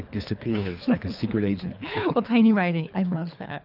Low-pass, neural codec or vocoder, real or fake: 5.4 kHz; codec, 24 kHz, 3 kbps, HILCodec; fake